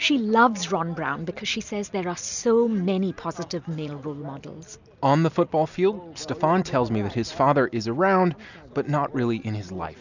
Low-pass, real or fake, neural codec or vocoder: 7.2 kHz; real; none